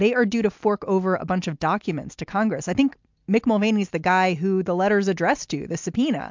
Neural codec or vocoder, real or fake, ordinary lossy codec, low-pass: none; real; MP3, 64 kbps; 7.2 kHz